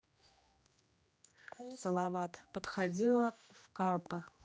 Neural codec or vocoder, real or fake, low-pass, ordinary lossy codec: codec, 16 kHz, 1 kbps, X-Codec, HuBERT features, trained on general audio; fake; none; none